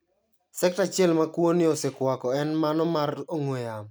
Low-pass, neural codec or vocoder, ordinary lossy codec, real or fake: none; none; none; real